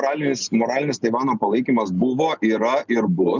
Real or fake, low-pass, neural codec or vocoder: real; 7.2 kHz; none